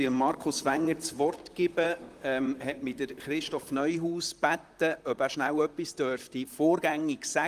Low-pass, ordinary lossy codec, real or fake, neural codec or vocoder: 14.4 kHz; Opus, 32 kbps; fake; vocoder, 44.1 kHz, 128 mel bands, Pupu-Vocoder